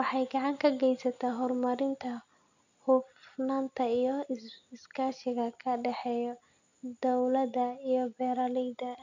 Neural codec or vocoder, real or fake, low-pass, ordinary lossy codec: none; real; 7.2 kHz; none